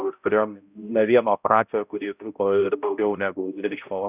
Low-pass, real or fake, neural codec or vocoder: 3.6 kHz; fake; codec, 16 kHz, 0.5 kbps, X-Codec, HuBERT features, trained on balanced general audio